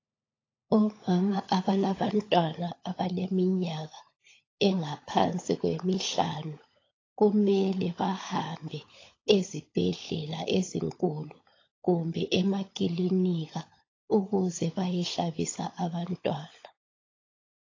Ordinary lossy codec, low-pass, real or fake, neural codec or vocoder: AAC, 32 kbps; 7.2 kHz; fake; codec, 16 kHz, 16 kbps, FunCodec, trained on LibriTTS, 50 frames a second